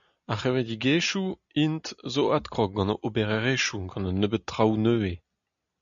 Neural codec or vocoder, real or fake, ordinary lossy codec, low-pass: none; real; MP3, 96 kbps; 7.2 kHz